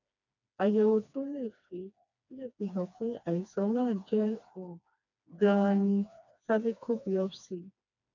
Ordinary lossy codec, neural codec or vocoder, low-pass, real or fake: none; codec, 16 kHz, 2 kbps, FreqCodec, smaller model; 7.2 kHz; fake